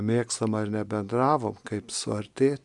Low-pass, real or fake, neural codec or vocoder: 10.8 kHz; real; none